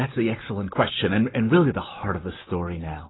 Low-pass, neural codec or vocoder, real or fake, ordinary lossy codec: 7.2 kHz; none; real; AAC, 16 kbps